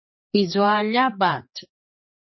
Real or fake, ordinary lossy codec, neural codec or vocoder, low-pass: fake; MP3, 24 kbps; codec, 16 kHz, 4 kbps, X-Codec, HuBERT features, trained on general audio; 7.2 kHz